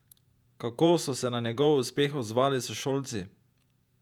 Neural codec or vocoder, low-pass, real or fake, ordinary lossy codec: vocoder, 48 kHz, 128 mel bands, Vocos; 19.8 kHz; fake; none